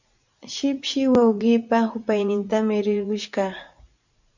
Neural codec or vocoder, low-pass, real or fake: vocoder, 44.1 kHz, 80 mel bands, Vocos; 7.2 kHz; fake